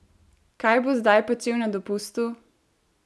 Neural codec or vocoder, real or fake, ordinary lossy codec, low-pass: none; real; none; none